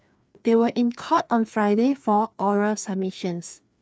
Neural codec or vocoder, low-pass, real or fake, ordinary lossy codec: codec, 16 kHz, 2 kbps, FreqCodec, larger model; none; fake; none